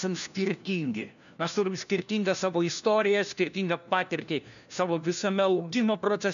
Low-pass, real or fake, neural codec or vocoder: 7.2 kHz; fake; codec, 16 kHz, 1 kbps, FunCodec, trained on LibriTTS, 50 frames a second